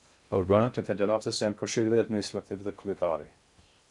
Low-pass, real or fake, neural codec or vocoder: 10.8 kHz; fake; codec, 16 kHz in and 24 kHz out, 0.6 kbps, FocalCodec, streaming, 2048 codes